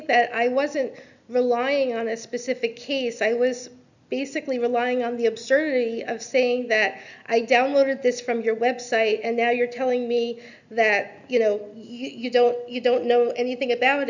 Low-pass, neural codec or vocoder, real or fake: 7.2 kHz; none; real